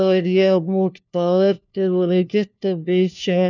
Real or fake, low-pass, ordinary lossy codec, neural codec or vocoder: fake; 7.2 kHz; none; codec, 16 kHz, 1 kbps, FunCodec, trained on LibriTTS, 50 frames a second